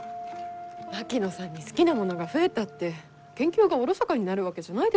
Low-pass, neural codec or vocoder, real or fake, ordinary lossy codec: none; none; real; none